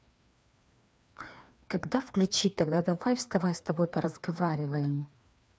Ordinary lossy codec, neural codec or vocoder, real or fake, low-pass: none; codec, 16 kHz, 2 kbps, FreqCodec, larger model; fake; none